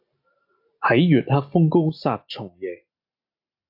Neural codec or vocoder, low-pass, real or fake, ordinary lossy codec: none; 5.4 kHz; real; AAC, 48 kbps